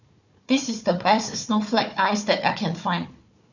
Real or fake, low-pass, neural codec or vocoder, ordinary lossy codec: fake; 7.2 kHz; codec, 16 kHz, 4 kbps, FunCodec, trained on Chinese and English, 50 frames a second; none